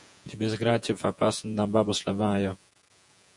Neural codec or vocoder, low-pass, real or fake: vocoder, 48 kHz, 128 mel bands, Vocos; 10.8 kHz; fake